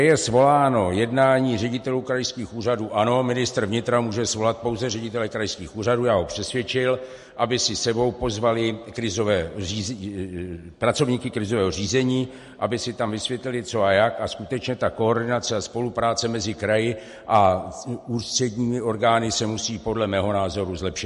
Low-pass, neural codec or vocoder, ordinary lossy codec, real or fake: 14.4 kHz; none; MP3, 48 kbps; real